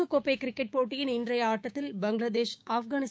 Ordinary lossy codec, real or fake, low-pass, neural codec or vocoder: none; fake; none; codec, 16 kHz, 6 kbps, DAC